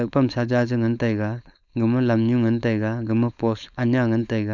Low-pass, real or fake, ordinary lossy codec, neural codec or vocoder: 7.2 kHz; fake; none; codec, 16 kHz, 4.8 kbps, FACodec